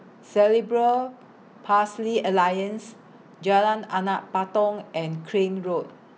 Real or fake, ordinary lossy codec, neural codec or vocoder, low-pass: real; none; none; none